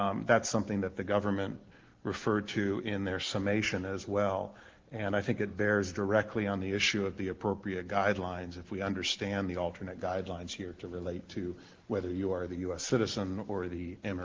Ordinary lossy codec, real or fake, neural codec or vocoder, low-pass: Opus, 16 kbps; real; none; 7.2 kHz